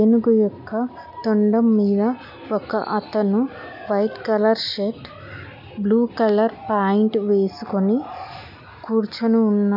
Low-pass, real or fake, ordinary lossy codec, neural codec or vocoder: 5.4 kHz; real; none; none